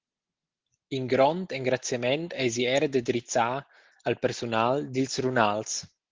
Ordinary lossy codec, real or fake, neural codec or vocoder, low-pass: Opus, 16 kbps; real; none; 7.2 kHz